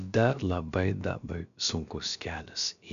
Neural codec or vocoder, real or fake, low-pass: codec, 16 kHz, about 1 kbps, DyCAST, with the encoder's durations; fake; 7.2 kHz